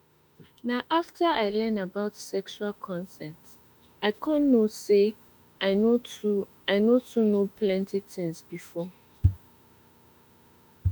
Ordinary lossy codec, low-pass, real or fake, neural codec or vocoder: none; none; fake; autoencoder, 48 kHz, 32 numbers a frame, DAC-VAE, trained on Japanese speech